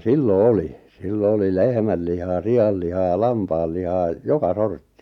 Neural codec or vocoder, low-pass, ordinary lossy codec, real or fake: none; 19.8 kHz; none; real